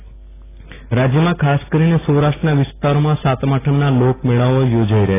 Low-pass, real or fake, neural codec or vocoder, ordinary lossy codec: 3.6 kHz; real; none; AAC, 16 kbps